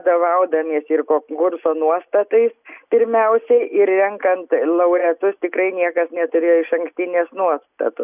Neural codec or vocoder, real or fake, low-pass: none; real; 3.6 kHz